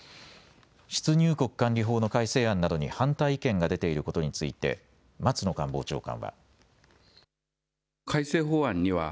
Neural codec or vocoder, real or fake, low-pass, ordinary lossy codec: none; real; none; none